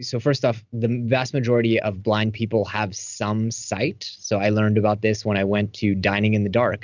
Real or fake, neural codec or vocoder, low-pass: real; none; 7.2 kHz